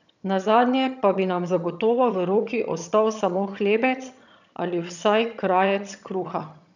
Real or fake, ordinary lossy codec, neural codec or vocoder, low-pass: fake; none; vocoder, 22.05 kHz, 80 mel bands, HiFi-GAN; 7.2 kHz